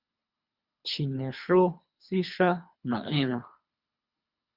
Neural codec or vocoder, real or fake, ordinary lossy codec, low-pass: codec, 24 kHz, 3 kbps, HILCodec; fake; Opus, 64 kbps; 5.4 kHz